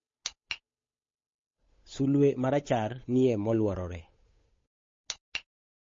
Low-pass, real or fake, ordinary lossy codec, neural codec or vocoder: 7.2 kHz; fake; MP3, 32 kbps; codec, 16 kHz, 8 kbps, FunCodec, trained on Chinese and English, 25 frames a second